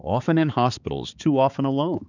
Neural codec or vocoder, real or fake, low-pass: codec, 16 kHz, 4 kbps, X-Codec, HuBERT features, trained on balanced general audio; fake; 7.2 kHz